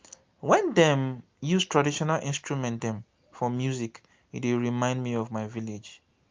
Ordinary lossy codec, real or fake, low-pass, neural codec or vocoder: Opus, 32 kbps; real; 7.2 kHz; none